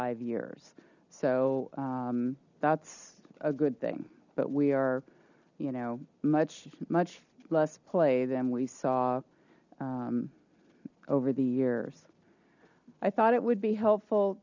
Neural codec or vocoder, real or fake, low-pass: none; real; 7.2 kHz